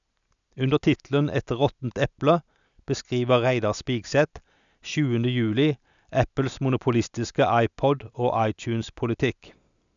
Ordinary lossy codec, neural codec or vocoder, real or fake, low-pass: none; none; real; 7.2 kHz